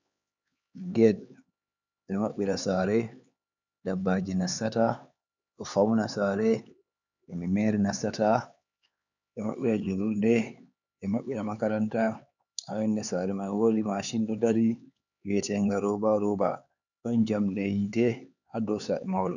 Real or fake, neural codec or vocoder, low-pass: fake; codec, 16 kHz, 4 kbps, X-Codec, HuBERT features, trained on LibriSpeech; 7.2 kHz